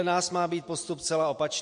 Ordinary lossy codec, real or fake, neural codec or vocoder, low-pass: MP3, 48 kbps; real; none; 9.9 kHz